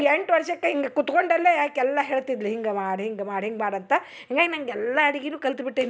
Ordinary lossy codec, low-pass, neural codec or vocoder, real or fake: none; none; none; real